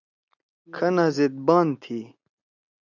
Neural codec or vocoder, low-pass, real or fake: none; 7.2 kHz; real